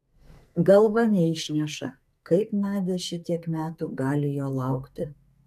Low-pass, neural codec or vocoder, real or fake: 14.4 kHz; codec, 44.1 kHz, 2.6 kbps, SNAC; fake